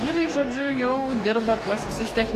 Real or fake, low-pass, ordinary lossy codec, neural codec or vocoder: fake; 14.4 kHz; AAC, 64 kbps; codec, 44.1 kHz, 2.6 kbps, DAC